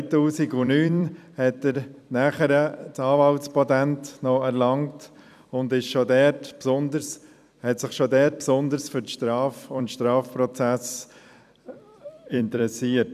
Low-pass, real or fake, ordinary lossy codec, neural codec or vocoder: 14.4 kHz; fake; none; vocoder, 44.1 kHz, 128 mel bands every 256 samples, BigVGAN v2